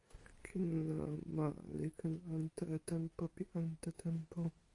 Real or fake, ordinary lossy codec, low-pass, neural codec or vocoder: real; AAC, 48 kbps; 10.8 kHz; none